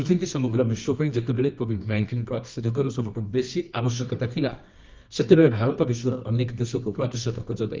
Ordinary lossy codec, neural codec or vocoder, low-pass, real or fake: Opus, 24 kbps; codec, 24 kHz, 0.9 kbps, WavTokenizer, medium music audio release; 7.2 kHz; fake